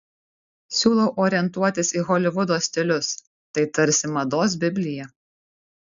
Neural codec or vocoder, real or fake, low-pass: none; real; 7.2 kHz